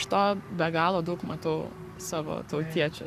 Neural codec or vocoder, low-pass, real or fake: codec, 44.1 kHz, 7.8 kbps, Pupu-Codec; 14.4 kHz; fake